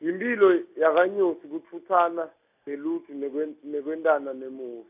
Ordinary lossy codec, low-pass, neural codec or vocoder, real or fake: AAC, 32 kbps; 3.6 kHz; none; real